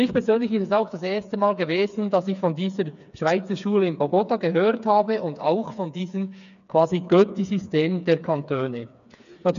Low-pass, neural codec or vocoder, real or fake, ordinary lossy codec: 7.2 kHz; codec, 16 kHz, 4 kbps, FreqCodec, smaller model; fake; none